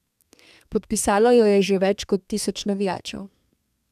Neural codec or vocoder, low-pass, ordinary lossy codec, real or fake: codec, 32 kHz, 1.9 kbps, SNAC; 14.4 kHz; none; fake